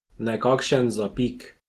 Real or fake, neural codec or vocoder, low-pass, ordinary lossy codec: real; none; 14.4 kHz; Opus, 16 kbps